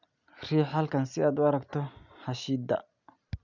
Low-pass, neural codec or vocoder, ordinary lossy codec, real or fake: 7.2 kHz; none; none; real